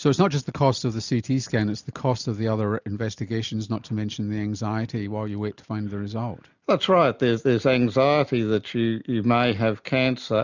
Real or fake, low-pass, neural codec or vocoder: real; 7.2 kHz; none